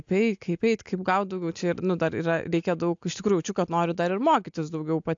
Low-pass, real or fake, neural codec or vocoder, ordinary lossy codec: 7.2 kHz; real; none; AAC, 64 kbps